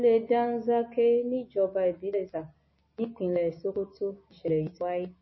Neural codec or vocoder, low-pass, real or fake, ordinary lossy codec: none; 7.2 kHz; real; MP3, 24 kbps